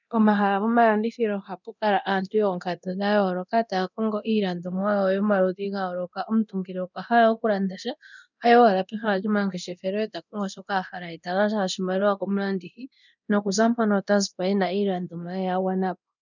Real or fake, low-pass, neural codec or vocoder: fake; 7.2 kHz; codec, 24 kHz, 0.9 kbps, DualCodec